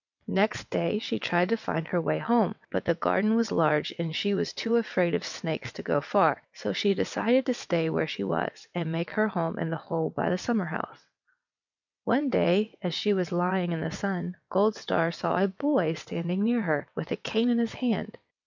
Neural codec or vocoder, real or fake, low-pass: vocoder, 22.05 kHz, 80 mel bands, WaveNeXt; fake; 7.2 kHz